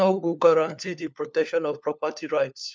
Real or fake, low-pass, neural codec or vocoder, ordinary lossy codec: fake; none; codec, 16 kHz, 4 kbps, FunCodec, trained on LibriTTS, 50 frames a second; none